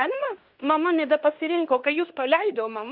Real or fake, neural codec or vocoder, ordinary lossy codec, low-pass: fake; codec, 16 kHz in and 24 kHz out, 0.9 kbps, LongCat-Audio-Codec, fine tuned four codebook decoder; Opus, 64 kbps; 5.4 kHz